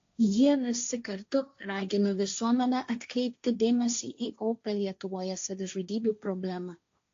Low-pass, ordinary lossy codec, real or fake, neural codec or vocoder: 7.2 kHz; AAC, 48 kbps; fake; codec, 16 kHz, 1.1 kbps, Voila-Tokenizer